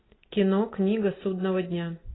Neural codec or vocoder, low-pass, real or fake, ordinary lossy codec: none; 7.2 kHz; real; AAC, 16 kbps